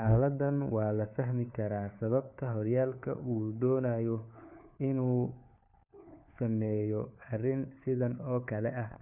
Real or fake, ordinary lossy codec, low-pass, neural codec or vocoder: fake; none; 3.6 kHz; codec, 16 kHz, 4 kbps, FunCodec, trained on LibriTTS, 50 frames a second